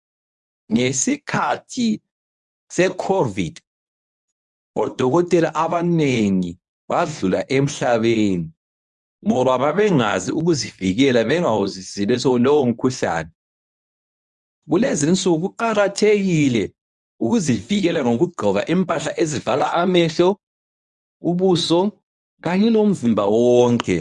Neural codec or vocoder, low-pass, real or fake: codec, 24 kHz, 0.9 kbps, WavTokenizer, medium speech release version 1; 10.8 kHz; fake